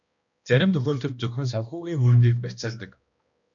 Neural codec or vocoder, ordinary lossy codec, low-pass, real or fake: codec, 16 kHz, 1 kbps, X-Codec, HuBERT features, trained on balanced general audio; MP3, 64 kbps; 7.2 kHz; fake